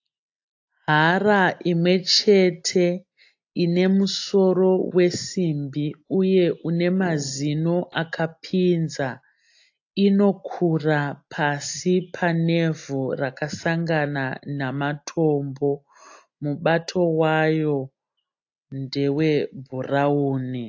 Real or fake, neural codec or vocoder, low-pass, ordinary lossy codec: real; none; 7.2 kHz; AAC, 48 kbps